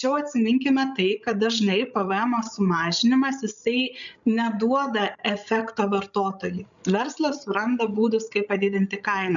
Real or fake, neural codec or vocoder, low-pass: fake; codec, 16 kHz, 16 kbps, FreqCodec, larger model; 7.2 kHz